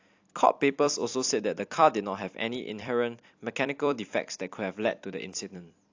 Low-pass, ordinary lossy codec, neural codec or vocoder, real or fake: 7.2 kHz; AAC, 48 kbps; vocoder, 44.1 kHz, 128 mel bands every 256 samples, BigVGAN v2; fake